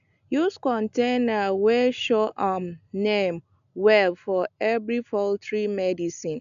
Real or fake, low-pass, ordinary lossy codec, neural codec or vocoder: real; 7.2 kHz; none; none